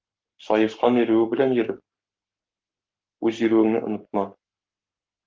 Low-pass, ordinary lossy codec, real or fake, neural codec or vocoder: 7.2 kHz; Opus, 32 kbps; fake; codec, 44.1 kHz, 7.8 kbps, Pupu-Codec